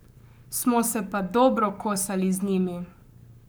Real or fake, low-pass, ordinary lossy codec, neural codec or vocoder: fake; none; none; codec, 44.1 kHz, 7.8 kbps, Pupu-Codec